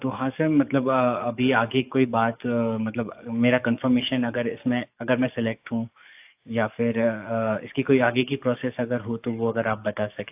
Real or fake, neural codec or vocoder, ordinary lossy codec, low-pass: fake; codec, 44.1 kHz, 7.8 kbps, DAC; AAC, 32 kbps; 3.6 kHz